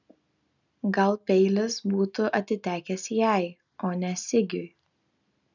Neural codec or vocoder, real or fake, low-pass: none; real; 7.2 kHz